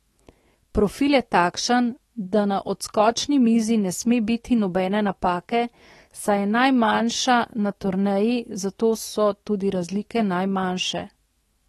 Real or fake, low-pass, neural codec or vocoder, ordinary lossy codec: fake; 19.8 kHz; autoencoder, 48 kHz, 128 numbers a frame, DAC-VAE, trained on Japanese speech; AAC, 32 kbps